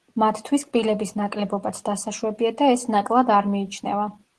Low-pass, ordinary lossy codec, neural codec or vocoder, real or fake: 10.8 kHz; Opus, 16 kbps; none; real